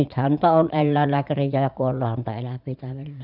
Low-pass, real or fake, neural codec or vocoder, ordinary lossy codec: 5.4 kHz; fake; vocoder, 44.1 kHz, 80 mel bands, Vocos; none